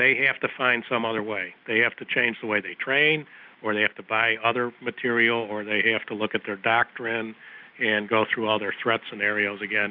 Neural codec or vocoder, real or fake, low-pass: none; real; 5.4 kHz